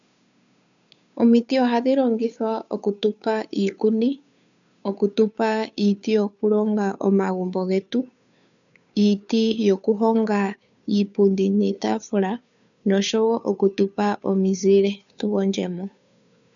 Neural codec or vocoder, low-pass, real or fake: codec, 16 kHz, 2 kbps, FunCodec, trained on Chinese and English, 25 frames a second; 7.2 kHz; fake